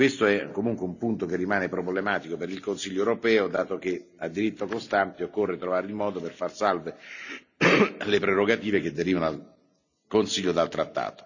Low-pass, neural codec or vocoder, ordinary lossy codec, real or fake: 7.2 kHz; none; none; real